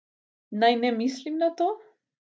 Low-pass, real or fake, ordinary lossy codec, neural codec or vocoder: none; real; none; none